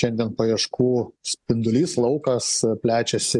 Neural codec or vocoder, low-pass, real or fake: none; 10.8 kHz; real